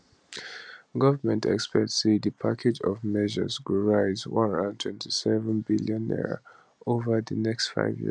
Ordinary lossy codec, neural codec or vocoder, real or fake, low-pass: none; none; real; 9.9 kHz